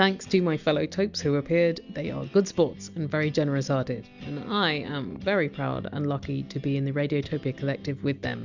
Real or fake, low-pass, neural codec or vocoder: real; 7.2 kHz; none